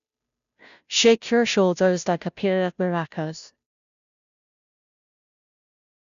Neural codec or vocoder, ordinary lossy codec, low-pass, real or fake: codec, 16 kHz, 0.5 kbps, FunCodec, trained on Chinese and English, 25 frames a second; none; 7.2 kHz; fake